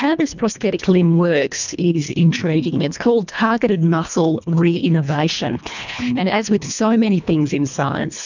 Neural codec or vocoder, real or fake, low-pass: codec, 24 kHz, 1.5 kbps, HILCodec; fake; 7.2 kHz